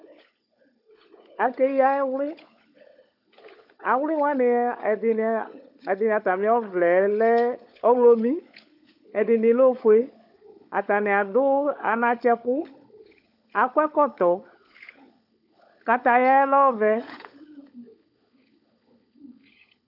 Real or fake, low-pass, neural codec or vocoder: fake; 5.4 kHz; codec, 16 kHz, 16 kbps, FunCodec, trained on LibriTTS, 50 frames a second